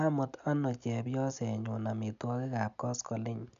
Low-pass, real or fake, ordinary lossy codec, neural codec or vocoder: 7.2 kHz; real; none; none